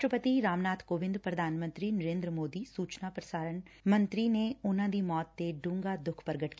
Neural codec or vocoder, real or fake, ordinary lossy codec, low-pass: none; real; none; none